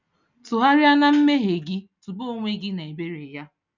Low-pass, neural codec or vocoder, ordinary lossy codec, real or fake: 7.2 kHz; none; none; real